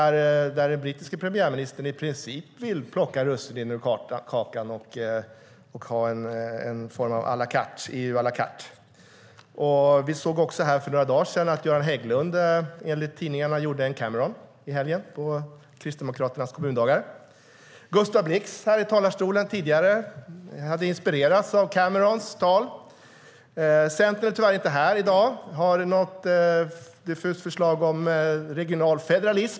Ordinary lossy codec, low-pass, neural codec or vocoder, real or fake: none; none; none; real